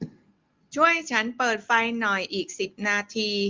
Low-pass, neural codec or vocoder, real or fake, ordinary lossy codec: 7.2 kHz; none; real; Opus, 32 kbps